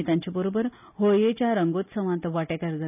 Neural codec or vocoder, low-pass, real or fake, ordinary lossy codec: none; 3.6 kHz; real; none